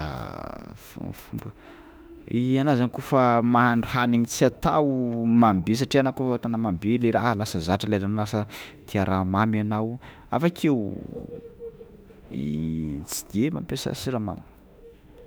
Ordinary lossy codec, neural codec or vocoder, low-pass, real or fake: none; autoencoder, 48 kHz, 32 numbers a frame, DAC-VAE, trained on Japanese speech; none; fake